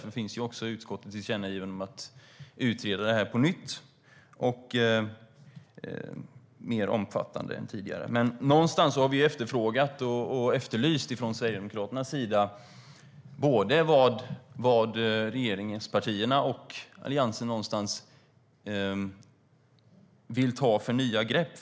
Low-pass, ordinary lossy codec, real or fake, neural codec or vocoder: none; none; real; none